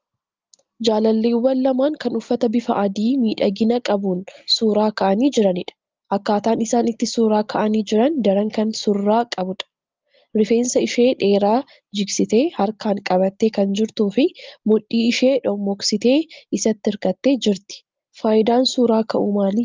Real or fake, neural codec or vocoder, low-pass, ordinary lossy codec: real; none; 7.2 kHz; Opus, 32 kbps